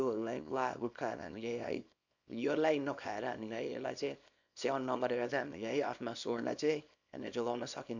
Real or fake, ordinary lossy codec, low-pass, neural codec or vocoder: fake; none; 7.2 kHz; codec, 24 kHz, 0.9 kbps, WavTokenizer, small release